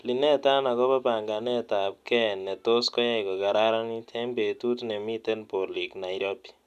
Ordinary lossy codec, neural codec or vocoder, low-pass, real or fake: none; none; 14.4 kHz; real